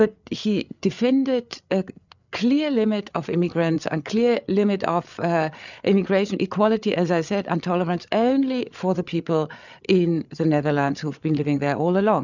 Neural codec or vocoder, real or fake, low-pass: codec, 16 kHz, 8 kbps, FreqCodec, larger model; fake; 7.2 kHz